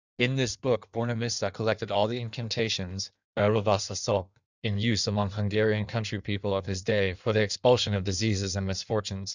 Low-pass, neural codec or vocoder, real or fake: 7.2 kHz; codec, 16 kHz in and 24 kHz out, 1.1 kbps, FireRedTTS-2 codec; fake